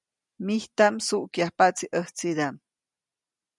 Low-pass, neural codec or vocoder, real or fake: 10.8 kHz; none; real